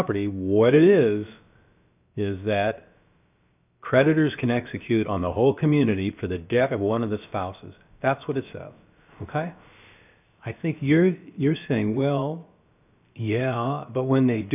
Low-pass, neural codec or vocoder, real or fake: 3.6 kHz; codec, 16 kHz, about 1 kbps, DyCAST, with the encoder's durations; fake